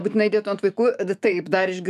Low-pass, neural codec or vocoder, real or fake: 14.4 kHz; autoencoder, 48 kHz, 128 numbers a frame, DAC-VAE, trained on Japanese speech; fake